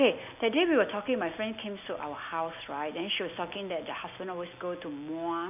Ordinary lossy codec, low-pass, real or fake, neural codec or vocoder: none; 3.6 kHz; real; none